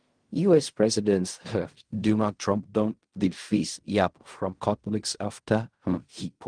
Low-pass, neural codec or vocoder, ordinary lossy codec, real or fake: 9.9 kHz; codec, 16 kHz in and 24 kHz out, 0.4 kbps, LongCat-Audio-Codec, fine tuned four codebook decoder; Opus, 24 kbps; fake